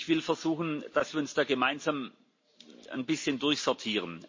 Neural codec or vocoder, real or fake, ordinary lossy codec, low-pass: none; real; MP3, 32 kbps; 7.2 kHz